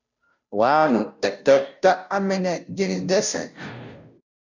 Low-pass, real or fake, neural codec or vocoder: 7.2 kHz; fake; codec, 16 kHz, 0.5 kbps, FunCodec, trained on Chinese and English, 25 frames a second